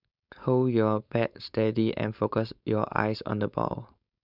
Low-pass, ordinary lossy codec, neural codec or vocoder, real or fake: 5.4 kHz; none; codec, 16 kHz, 4.8 kbps, FACodec; fake